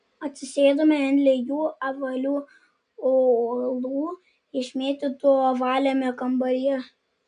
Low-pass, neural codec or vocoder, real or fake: 9.9 kHz; none; real